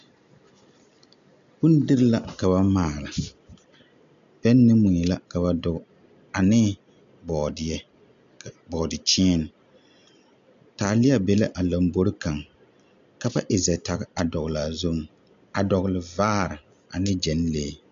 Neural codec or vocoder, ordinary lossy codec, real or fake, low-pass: none; MP3, 64 kbps; real; 7.2 kHz